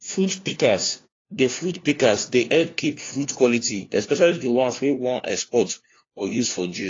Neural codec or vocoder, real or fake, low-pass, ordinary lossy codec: codec, 16 kHz, 1 kbps, FunCodec, trained on LibriTTS, 50 frames a second; fake; 7.2 kHz; AAC, 32 kbps